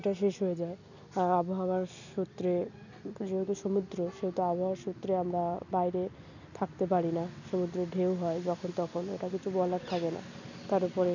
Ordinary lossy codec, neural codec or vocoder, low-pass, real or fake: none; none; 7.2 kHz; real